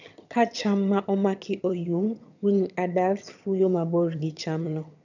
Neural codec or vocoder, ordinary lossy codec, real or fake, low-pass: vocoder, 22.05 kHz, 80 mel bands, HiFi-GAN; none; fake; 7.2 kHz